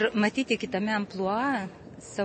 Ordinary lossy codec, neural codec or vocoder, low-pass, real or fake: MP3, 32 kbps; none; 10.8 kHz; real